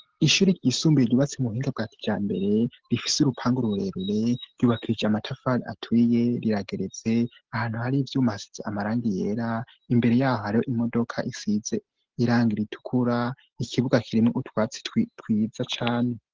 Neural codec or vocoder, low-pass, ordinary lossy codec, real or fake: none; 7.2 kHz; Opus, 16 kbps; real